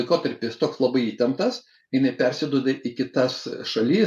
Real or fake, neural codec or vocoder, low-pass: real; none; 14.4 kHz